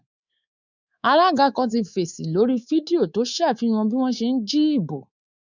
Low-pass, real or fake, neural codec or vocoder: 7.2 kHz; fake; codec, 24 kHz, 3.1 kbps, DualCodec